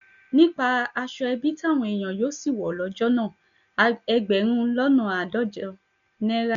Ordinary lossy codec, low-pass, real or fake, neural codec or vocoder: none; 7.2 kHz; real; none